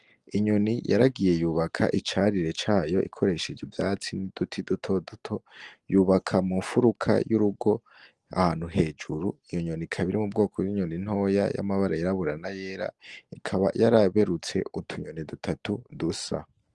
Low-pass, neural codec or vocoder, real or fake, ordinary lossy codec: 10.8 kHz; none; real; Opus, 24 kbps